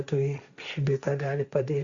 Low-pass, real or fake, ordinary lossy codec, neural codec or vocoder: 7.2 kHz; fake; Opus, 64 kbps; codec, 16 kHz, 1.1 kbps, Voila-Tokenizer